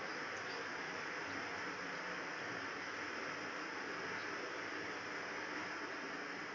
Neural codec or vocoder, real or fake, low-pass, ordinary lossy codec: none; real; 7.2 kHz; none